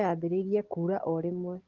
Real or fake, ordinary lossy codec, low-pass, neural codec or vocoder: real; Opus, 16 kbps; 7.2 kHz; none